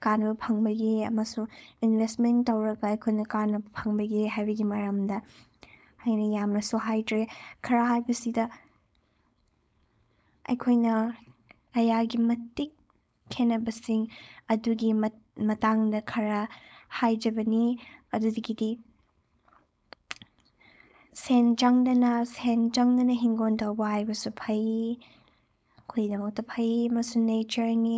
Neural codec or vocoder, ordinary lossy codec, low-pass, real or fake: codec, 16 kHz, 4.8 kbps, FACodec; none; none; fake